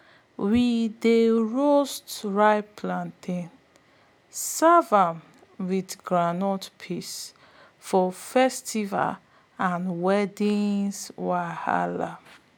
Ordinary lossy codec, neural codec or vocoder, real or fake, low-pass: none; none; real; none